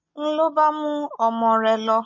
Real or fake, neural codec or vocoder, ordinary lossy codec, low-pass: real; none; MP3, 32 kbps; 7.2 kHz